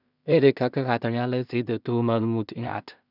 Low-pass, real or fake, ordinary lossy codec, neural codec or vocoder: 5.4 kHz; fake; none; codec, 16 kHz in and 24 kHz out, 0.4 kbps, LongCat-Audio-Codec, two codebook decoder